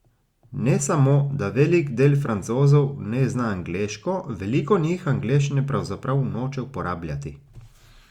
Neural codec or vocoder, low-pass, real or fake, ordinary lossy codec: none; 19.8 kHz; real; Opus, 64 kbps